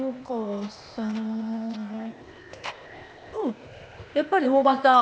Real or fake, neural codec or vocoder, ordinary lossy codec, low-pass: fake; codec, 16 kHz, 0.8 kbps, ZipCodec; none; none